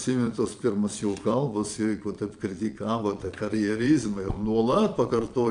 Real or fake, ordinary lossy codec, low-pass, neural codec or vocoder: fake; AAC, 64 kbps; 9.9 kHz; vocoder, 22.05 kHz, 80 mel bands, Vocos